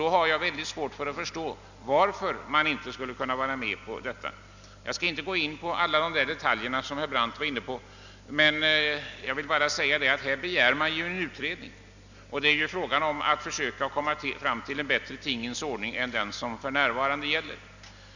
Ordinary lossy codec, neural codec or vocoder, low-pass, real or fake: none; none; 7.2 kHz; real